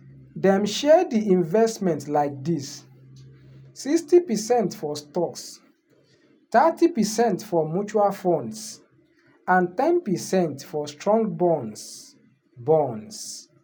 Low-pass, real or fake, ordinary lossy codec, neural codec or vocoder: none; real; none; none